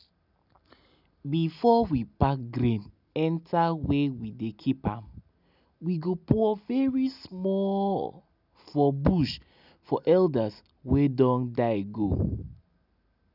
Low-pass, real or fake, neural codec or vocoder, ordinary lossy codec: 5.4 kHz; real; none; none